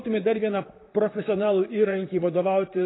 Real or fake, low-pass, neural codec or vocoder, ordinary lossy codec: fake; 7.2 kHz; vocoder, 44.1 kHz, 128 mel bands, Pupu-Vocoder; AAC, 16 kbps